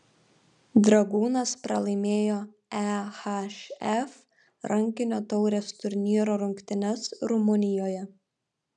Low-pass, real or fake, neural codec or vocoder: 10.8 kHz; real; none